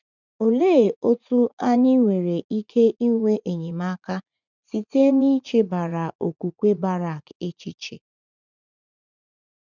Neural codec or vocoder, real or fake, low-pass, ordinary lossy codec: vocoder, 44.1 kHz, 80 mel bands, Vocos; fake; 7.2 kHz; none